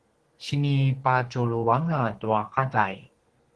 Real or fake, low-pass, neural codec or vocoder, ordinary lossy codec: fake; 10.8 kHz; codec, 32 kHz, 1.9 kbps, SNAC; Opus, 16 kbps